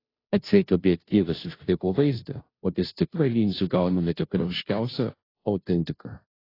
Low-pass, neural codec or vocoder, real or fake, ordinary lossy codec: 5.4 kHz; codec, 16 kHz, 0.5 kbps, FunCodec, trained on Chinese and English, 25 frames a second; fake; AAC, 24 kbps